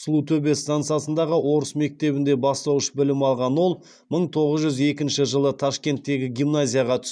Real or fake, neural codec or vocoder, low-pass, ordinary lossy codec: real; none; 9.9 kHz; none